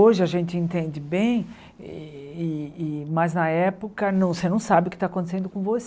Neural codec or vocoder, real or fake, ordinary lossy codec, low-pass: none; real; none; none